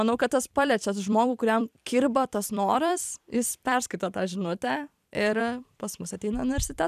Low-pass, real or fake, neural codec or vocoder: 14.4 kHz; fake; vocoder, 44.1 kHz, 128 mel bands every 256 samples, BigVGAN v2